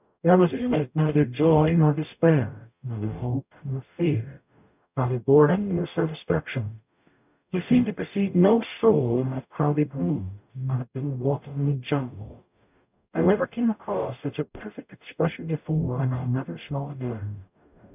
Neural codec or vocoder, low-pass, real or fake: codec, 44.1 kHz, 0.9 kbps, DAC; 3.6 kHz; fake